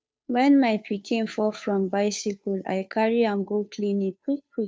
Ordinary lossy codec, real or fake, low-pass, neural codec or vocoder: none; fake; none; codec, 16 kHz, 2 kbps, FunCodec, trained on Chinese and English, 25 frames a second